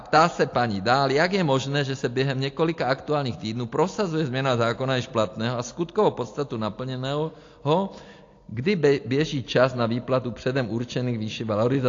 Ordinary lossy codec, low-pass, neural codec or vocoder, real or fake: AAC, 48 kbps; 7.2 kHz; none; real